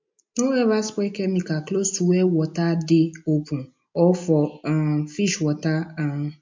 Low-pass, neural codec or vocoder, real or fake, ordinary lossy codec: 7.2 kHz; none; real; MP3, 48 kbps